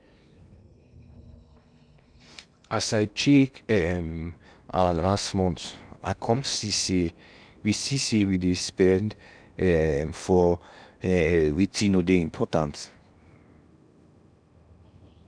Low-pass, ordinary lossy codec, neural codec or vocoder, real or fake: 9.9 kHz; none; codec, 16 kHz in and 24 kHz out, 0.8 kbps, FocalCodec, streaming, 65536 codes; fake